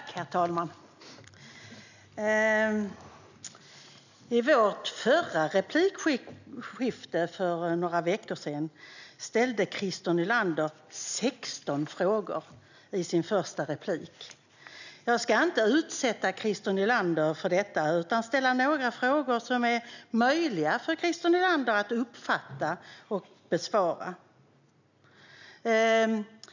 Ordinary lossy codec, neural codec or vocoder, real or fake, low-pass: none; none; real; 7.2 kHz